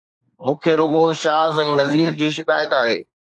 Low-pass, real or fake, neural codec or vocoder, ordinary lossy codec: 10.8 kHz; fake; codec, 24 kHz, 1 kbps, SNAC; AAC, 64 kbps